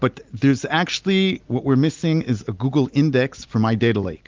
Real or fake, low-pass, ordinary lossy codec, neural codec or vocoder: real; 7.2 kHz; Opus, 32 kbps; none